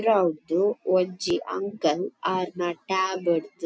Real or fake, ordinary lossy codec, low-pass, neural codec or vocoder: real; none; none; none